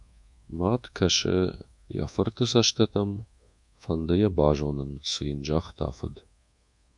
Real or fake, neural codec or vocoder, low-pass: fake; codec, 24 kHz, 1.2 kbps, DualCodec; 10.8 kHz